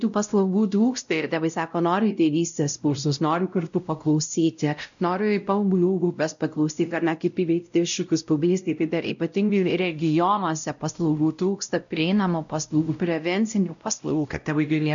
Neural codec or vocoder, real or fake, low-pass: codec, 16 kHz, 0.5 kbps, X-Codec, WavLM features, trained on Multilingual LibriSpeech; fake; 7.2 kHz